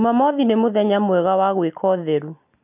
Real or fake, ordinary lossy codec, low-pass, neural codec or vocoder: real; none; 3.6 kHz; none